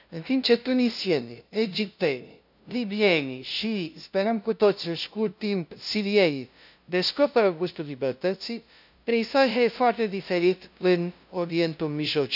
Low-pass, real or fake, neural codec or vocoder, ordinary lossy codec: 5.4 kHz; fake; codec, 16 kHz, 0.5 kbps, FunCodec, trained on LibriTTS, 25 frames a second; none